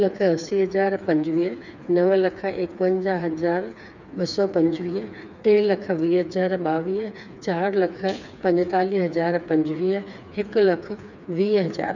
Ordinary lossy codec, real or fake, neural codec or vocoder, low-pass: none; fake; codec, 16 kHz, 4 kbps, FreqCodec, smaller model; 7.2 kHz